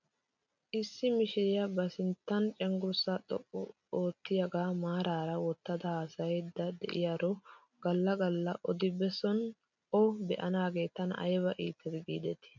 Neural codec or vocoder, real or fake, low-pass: none; real; 7.2 kHz